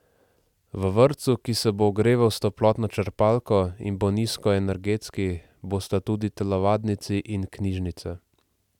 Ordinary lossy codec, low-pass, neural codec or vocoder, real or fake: none; 19.8 kHz; none; real